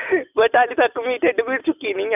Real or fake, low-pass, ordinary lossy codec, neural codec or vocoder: real; 3.6 kHz; none; none